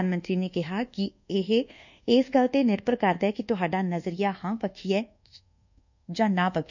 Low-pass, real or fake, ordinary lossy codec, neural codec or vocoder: 7.2 kHz; fake; none; codec, 24 kHz, 1.2 kbps, DualCodec